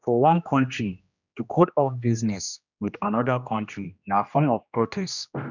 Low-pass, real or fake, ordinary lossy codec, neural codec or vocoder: 7.2 kHz; fake; none; codec, 16 kHz, 1 kbps, X-Codec, HuBERT features, trained on general audio